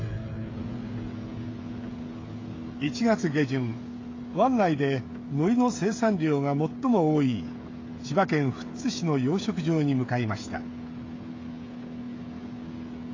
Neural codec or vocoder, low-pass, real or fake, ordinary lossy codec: codec, 16 kHz, 16 kbps, FreqCodec, smaller model; 7.2 kHz; fake; AAC, 32 kbps